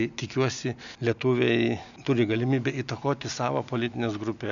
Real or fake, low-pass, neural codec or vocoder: real; 7.2 kHz; none